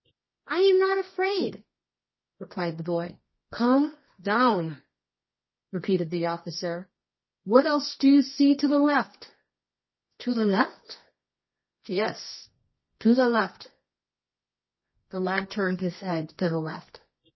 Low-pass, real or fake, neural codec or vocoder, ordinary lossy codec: 7.2 kHz; fake; codec, 24 kHz, 0.9 kbps, WavTokenizer, medium music audio release; MP3, 24 kbps